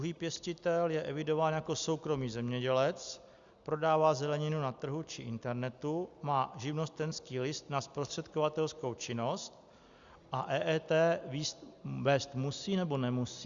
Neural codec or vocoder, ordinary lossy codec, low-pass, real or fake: none; Opus, 64 kbps; 7.2 kHz; real